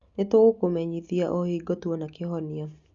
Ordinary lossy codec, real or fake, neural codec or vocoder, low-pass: Opus, 64 kbps; real; none; 7.2 kHz